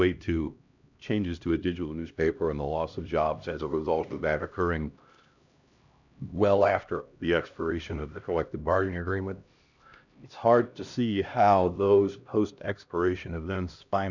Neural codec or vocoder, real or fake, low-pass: codec, 16 kHz, 1 kbps, X-Codec, HuBERT features, trained on LibriSpeech; fake; 7.2 kHz